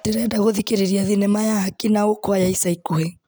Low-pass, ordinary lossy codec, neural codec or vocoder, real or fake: none; none; none; real